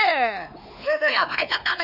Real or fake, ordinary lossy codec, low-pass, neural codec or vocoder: fake; none; 5.4 kHz; codec, 16 kHz, 2 kbps, X-Codec, WavLM features, trained on Multilingual LibriSpeech